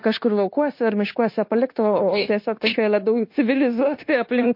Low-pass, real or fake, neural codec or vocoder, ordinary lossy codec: 5.4 kHz; fake; codec, 16 kHz, 0.9 kbps, LongCat-Audio-Codec; MP3, 32 kbps